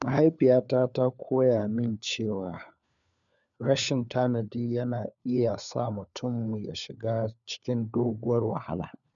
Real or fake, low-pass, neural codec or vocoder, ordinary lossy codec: fake; 7.2 kHz; codec, 16 kHz, 4 kbps, FunCodec, trained on LibriTTS, 50 frames a second; none